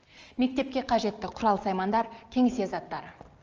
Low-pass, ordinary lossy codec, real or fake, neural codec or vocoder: 7.2 kHz; Opus, 24 kbps; real; none